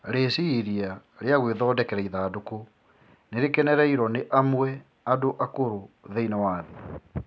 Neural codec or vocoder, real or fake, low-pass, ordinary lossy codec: none; real; none; none